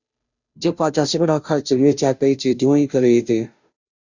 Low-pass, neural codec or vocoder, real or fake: 7.2 kHz; codec, 16 kHz, 0.5 kbps, FunCodec, trained on Chinese and English, 25 frames a second; fake